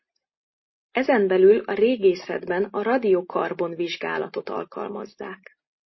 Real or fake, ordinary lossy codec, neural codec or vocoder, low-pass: real; MP3, 24 kbps; none; 7.2 kHz